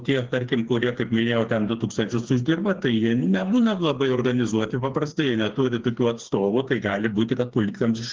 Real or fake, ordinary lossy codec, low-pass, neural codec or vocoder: fake; Opus, 16 kbps; 7.2 kHz; codec, 16 kHz, 4 kbps, FreqCodec, smaller model